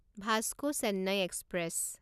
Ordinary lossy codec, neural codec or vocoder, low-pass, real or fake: none; none; 14.4 kHz; real